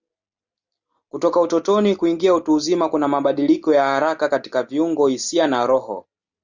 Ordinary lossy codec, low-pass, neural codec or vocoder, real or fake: Opus, 64 kbps; 7.2 kHz; none; real